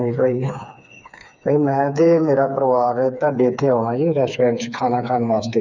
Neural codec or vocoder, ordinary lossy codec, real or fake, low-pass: codec, 16 kHz, 4 kbps, FreqCodec, smaller model; none; fake; 7.2 kHz